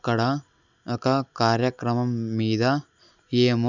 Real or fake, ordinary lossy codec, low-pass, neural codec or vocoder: real; none; 7.2 kHz; none